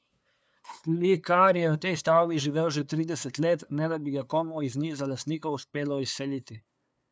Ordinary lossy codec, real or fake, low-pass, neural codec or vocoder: none; fake; none; codec, 16 kHz, 2 kbps, FunCodec, trained on LibriTTS, 25 frames a second